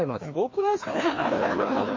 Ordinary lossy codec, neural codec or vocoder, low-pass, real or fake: MP3, 32 kbps; codec, 16 kHz, 2 kbps, FreqCodec, larger model; 7.2 kHz; fake